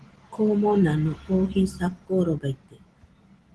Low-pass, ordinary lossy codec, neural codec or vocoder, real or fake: 10.8 kHz; Opus, 16 kbps; none; real